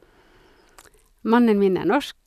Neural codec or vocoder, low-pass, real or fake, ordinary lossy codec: none; 14.4 kHz; real; none